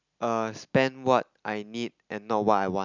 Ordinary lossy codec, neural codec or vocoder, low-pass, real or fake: none; none; 7.2 kHz; real